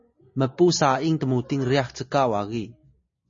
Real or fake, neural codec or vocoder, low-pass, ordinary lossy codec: real; none; 7.2 kHz; MP3, 32 kbps